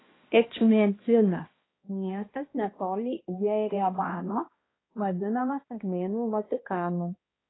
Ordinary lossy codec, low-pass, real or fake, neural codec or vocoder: AAC, 16 kbps; 7.2 kHz; fake; codec, 16 kHz, 1 kbps, X-Codec, HuBERT features, trained on balanced general audio